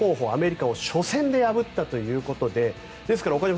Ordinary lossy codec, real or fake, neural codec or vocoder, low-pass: none; real; none; none